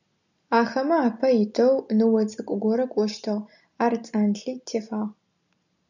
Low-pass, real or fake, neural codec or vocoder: 7.2 kHz; real; none